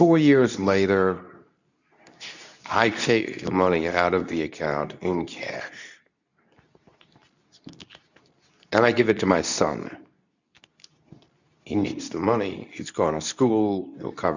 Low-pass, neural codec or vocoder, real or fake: 7.2 kHz; codec, 24 kHz, 0.9 kbps, WavTokenizer, medium speech release version 2; fake